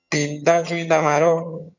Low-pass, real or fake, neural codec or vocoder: 7.2 kHz; fake; vocoder, 22.05 kHz, 80 mel bands, HiFi-GAN